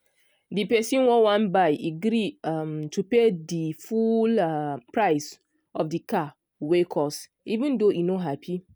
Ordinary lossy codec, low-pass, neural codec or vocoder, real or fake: none; none; none; real